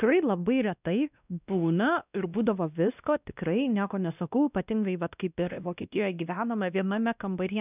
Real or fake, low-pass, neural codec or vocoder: fake; 3.6 kHz; codec, 16 kHz, 1 kbps, X-Codec, WavLM features, trained on Multilingual LibriSpeech